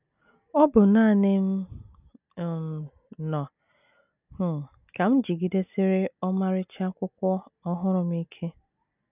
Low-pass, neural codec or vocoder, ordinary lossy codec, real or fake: 3.6 kHz; none; none; real